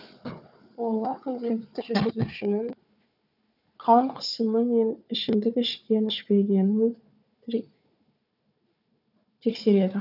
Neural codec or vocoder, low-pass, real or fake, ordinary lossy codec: codec, 16 kHz, 4 kbps, FunCodec, trained on Chinese and English, 50 frames a second; 5.4 kHz; fake; none